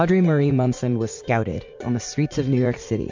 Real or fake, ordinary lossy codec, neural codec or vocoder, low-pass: fake; MP3, 48 kbps; vocoder, 44.1 kHz, 80 mel bands, Vocos; 7.2 kHz